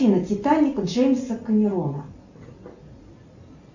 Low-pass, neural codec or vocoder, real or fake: 7.2 kHz; none; real